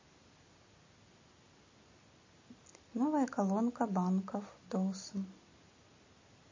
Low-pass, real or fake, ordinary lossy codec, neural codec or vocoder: 7.2 kHz; real; MP3, 32 kbps; none